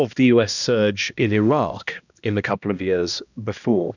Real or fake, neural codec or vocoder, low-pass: fake; codec, 16 kHz, 1 kbps, X-Codec, HuBERT features, trained on balanced general audio; 7.2 kHz